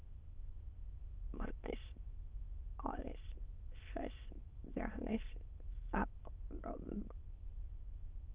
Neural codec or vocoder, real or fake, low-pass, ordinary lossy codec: autoencoder, 22.05 kHz, a latent of 192 numbers a frame, VITS, trained on many speakers; fake; 3.6 kHz; Opus, 32 kbps